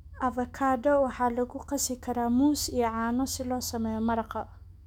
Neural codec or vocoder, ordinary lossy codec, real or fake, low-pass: autoencoder, 48 kHz, 128 numbers a frame, DAC-VAE, trained on Japanese speech; none; fake; 19.8 kHz